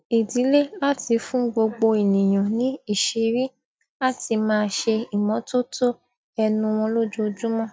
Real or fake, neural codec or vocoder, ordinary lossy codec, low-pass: real; none; none; none